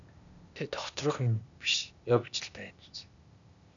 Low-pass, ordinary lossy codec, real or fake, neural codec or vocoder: 7.2 kHz; MP3, 96 kbps; fake; codec, 16 kHz, 0.8 kbps, ZipCodec